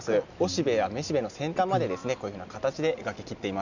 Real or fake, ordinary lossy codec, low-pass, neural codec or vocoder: real; none; 7.2 kHz; none